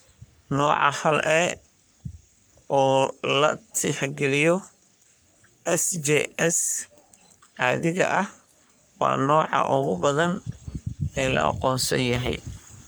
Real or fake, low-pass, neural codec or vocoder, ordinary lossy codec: fake; none; codec, 44.1 kHz, 3.4 kbps, Pupu-Codec; none